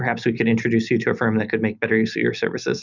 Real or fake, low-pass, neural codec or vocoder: real; 7.2 kHz; none